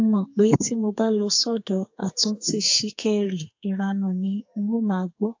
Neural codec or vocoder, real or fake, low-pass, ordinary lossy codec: codec, 32 kHz, 1.9 kbps, SNAC; fake; 7.2 kHz; AAC, 48 kbps